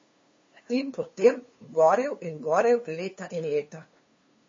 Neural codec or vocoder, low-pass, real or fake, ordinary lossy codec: codec, 16 kHz, 2 kbps, FunCodec, trained on LibriTTS, 25 frames a second; 7.2 kHz; fake; MP3, 32 kbps